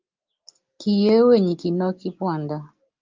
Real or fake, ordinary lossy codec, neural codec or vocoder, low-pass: real; Opus, 32 kbps; none; 7.2 kHz